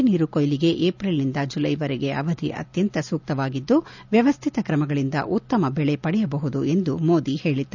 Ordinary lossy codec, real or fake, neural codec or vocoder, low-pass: none; real; none; 7.2 kHz